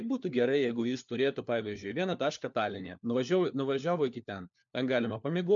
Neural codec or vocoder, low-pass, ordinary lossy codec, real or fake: codec, 16 kHz, 4 kbps, FunCodec, trained on LibriTTS, 50 frames a second; 7.2 kHz; MP3, 64 kbps; fake